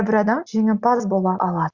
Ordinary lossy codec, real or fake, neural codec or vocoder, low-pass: Opus, 64 kbps; real; none; 7.2 kHz